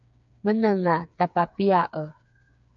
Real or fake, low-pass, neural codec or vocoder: fake; 7.2 kHz; codec, 16 kHz, 4 kbps, FreqCodec, smaller model